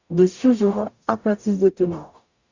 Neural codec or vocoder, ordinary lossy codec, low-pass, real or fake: codec, 44.1 kHz, 0.9 kbps, DAC; Opus, 64 kbps; 7.2 kHz; fake